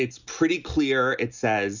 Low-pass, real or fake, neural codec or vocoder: 7.2 kHz; real; none